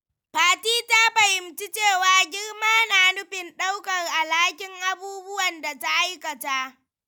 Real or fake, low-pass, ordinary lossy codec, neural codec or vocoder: real; none; none; none